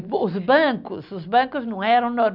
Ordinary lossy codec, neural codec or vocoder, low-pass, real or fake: none; none; 5.4 kHz; real